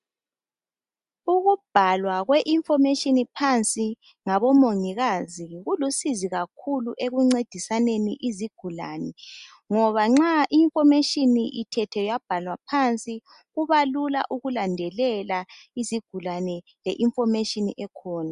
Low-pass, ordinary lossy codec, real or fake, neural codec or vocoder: 10.8 kHz; Opus, 64 kbps; real; none